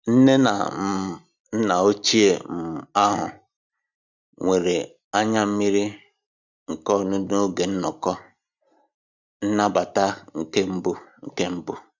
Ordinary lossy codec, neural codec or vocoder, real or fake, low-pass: none; none; real; 7.2 kHz